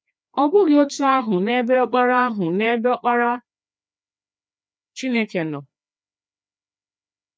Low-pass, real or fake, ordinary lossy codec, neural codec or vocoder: none; fake; none; codec, 16 kHz, 2 kbps, FreqCodec, larger model